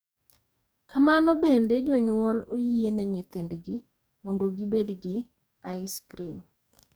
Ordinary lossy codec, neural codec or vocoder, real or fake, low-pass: none; codec, 44.1 kHz, 2.6 kbps, DAC; fake; none